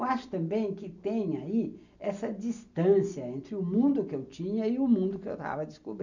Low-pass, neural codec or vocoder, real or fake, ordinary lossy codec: 7.2 kHz; none; real; none